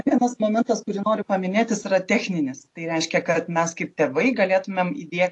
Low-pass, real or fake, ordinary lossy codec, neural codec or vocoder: 10.8 kHz; real; AAC, 48 kbps; none